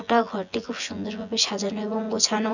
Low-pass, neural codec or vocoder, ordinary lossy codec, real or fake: 7.2 kHz; vocoder, 24 kHz, 100 mel bands, Vocos; none; fake